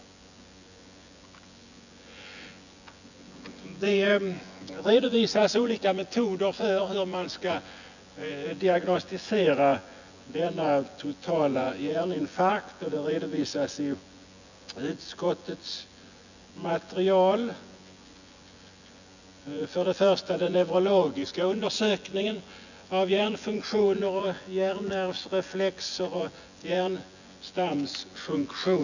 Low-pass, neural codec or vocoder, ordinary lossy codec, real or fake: 7.2 kHz; vocoder, 24 kHz, 100 mel bands, Vocos; none; fake